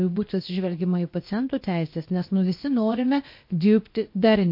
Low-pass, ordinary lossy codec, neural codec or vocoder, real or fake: 5.4 kHz; MP3, 32 kbps; codec, 16 kHz, about 1 kbps, DyCAST, with the encoder's durations; fake